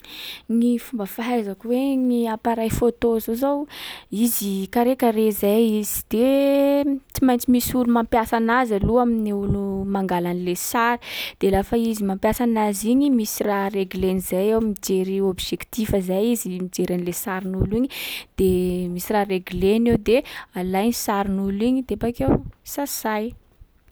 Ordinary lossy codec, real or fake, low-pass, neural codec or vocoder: none; real; none; none